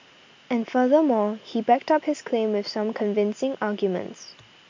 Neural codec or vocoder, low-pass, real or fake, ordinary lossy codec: none; 7.2 kHz; real; MP3, 64 kbps